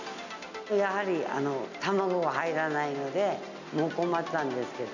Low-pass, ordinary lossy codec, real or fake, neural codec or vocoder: 7.2 kHz; none; real; none